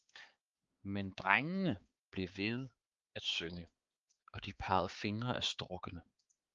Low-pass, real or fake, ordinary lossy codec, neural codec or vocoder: 7.2 kHz; fake; Opus, 32 kbps; codec, 16 kHz, 4 kbps, X-Codec, HuBERT features, trained on balanced general audio